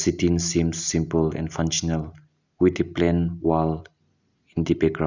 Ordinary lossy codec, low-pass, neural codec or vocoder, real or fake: none; 7.2 kHz; none; real